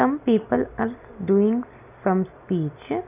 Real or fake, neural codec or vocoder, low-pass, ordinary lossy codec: real; none; 3.6 kHz; none